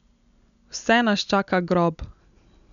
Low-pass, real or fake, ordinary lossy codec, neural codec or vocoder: 7.2 kHz; real; none; none